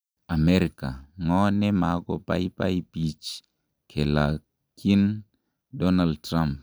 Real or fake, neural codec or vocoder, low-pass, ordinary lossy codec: real; none; none; none